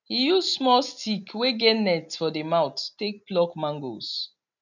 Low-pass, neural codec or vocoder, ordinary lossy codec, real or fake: 7.2 kHz; none; none; real